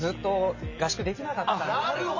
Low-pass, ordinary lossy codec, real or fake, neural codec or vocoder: 7.2 kHz; MP3, 32 kbps; real; none